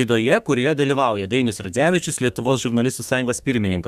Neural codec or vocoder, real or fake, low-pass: codec, 32 kHz, 1.9 kbps, SNAC; fake; 14.4 kHz